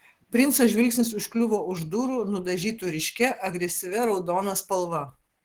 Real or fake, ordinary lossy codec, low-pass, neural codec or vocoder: fake; Opus, 16 kbps; 19.8 kHz; codec, 44.1 kHz, 7.8 kbps, Pupu-Codec